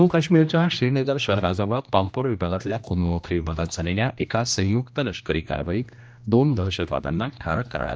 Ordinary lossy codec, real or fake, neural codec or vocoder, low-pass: none; fake; codec, 16 kHz, 1 kbps, X-Codec, HuBERT features, trained on general audio; none